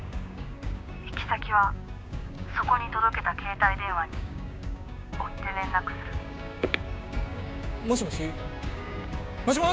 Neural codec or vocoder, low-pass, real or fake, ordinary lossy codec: codec, 16 kHz, 6 kbps, DAC; none; fake; none